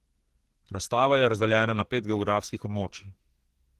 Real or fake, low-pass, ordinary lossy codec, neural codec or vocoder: fake; 14.4 kHz; Opus, 16 kbps; codec, 44.1 kHz, 2.6 kbps, SNAC